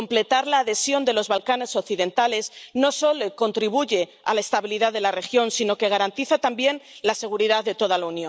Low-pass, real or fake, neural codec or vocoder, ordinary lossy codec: none; real; none; none